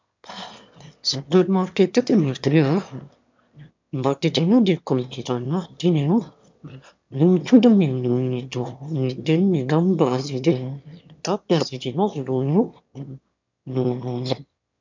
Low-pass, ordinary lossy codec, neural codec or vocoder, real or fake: 7.2 kHz; MP3, 64 kbps; autoencoder, 22.05 kHz, a latent of 192 numbers a frame, VITS, trained on one speaker; fake